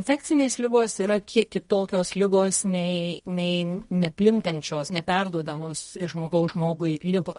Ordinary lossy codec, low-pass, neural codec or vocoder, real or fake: MP3, 48 kbps; 10.8 kHz; codec, 24 kHz, 0.9 kbps, WavTokenizer, medium music audio release; fake